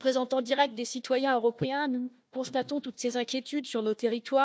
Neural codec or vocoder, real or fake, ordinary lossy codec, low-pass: codec, 16 kHz, 1 kbps, FunCodec, trained on Chinese and English, 50 frames a second; fake; none; none